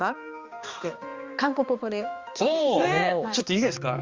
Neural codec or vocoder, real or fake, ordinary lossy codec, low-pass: codec, 16 kHz, 2 kbps, X-Codec, HuBERT features, trained on balanced general audio; fake; Opus, 32 kbps; 7.2 kHz